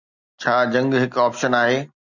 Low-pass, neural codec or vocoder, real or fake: 7.2 kHz; none; real